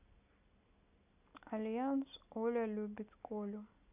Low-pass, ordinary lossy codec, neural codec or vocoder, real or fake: 3.6 kHz; none; none; real